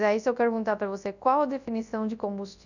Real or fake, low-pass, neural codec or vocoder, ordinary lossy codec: fake; 7.2 kHz; codec, 24 kHz, 0.9 kbps, WavTokenizer, large speech release; none